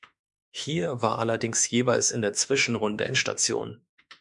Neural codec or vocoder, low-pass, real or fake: autoencoder, 48 kHz, 32 numbers a frame, DAC-VAE, trained on Japanese speech; 10.8 kHz; fake